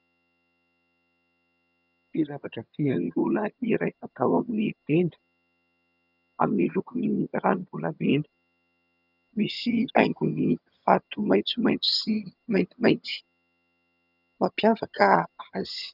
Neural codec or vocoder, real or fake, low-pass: vocoder, 22.05 kHz, 80 mel bands, HiFi-GAN; fake; 5.4 kHz